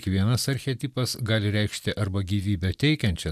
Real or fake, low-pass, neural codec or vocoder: fake; 14.4 kHz; vocoder, 44.1 kHz, 128 mel bands every 256 samples, BigVGAN v2